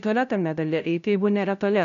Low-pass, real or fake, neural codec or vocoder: 7.2 kHz; fake; codec, 16 kHz, 0.5 kbps, FunCodec, trained on LibriTTS, 25 frames a second